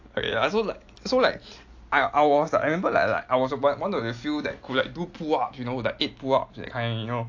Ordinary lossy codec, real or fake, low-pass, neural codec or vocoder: AAC, 48 kbps; real; 7.2 kHz; none